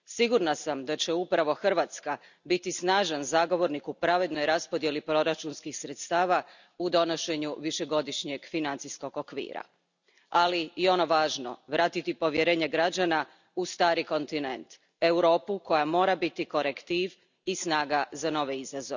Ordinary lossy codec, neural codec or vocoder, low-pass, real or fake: none; none; 7.2 kHz; real